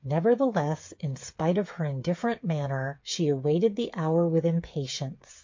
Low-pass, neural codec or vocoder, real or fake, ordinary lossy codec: 7.2 kHz; codec, 16 kHz, 8 kbps, FreqCodec, smaller model; fake; MP3, 48 kbps